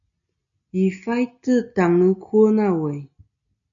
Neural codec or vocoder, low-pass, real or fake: none; 7.2 kHz; real